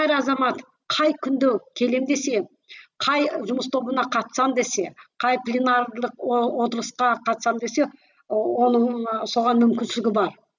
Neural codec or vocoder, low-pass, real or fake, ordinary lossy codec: none; 7.2 kHz; real; none